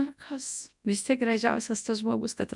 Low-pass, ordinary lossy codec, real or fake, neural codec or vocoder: 10.8 kHz; MP3, 96 kbps; fake; codec, 24 kHz, 0.9 kbps, WavTokenizer, large speech release